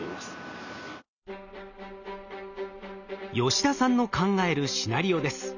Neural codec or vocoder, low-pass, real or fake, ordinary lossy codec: none; 7.2 kHz; real; none